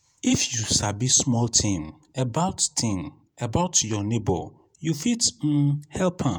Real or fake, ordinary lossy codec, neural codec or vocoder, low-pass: fake; none; vocoder, 48 kHz, 128 mel bands, Vocos; none